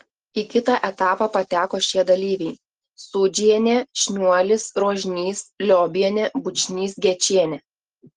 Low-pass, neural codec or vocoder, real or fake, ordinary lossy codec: 10.8 kHz; none; real; Opus, 16 kbps